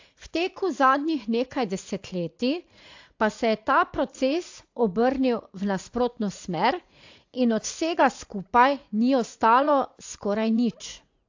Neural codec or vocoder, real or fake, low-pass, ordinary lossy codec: vocoder, 44.1 kHz, 80 mel bands, Vocos; fake; 7.2 kHz; AAC, 48 kbps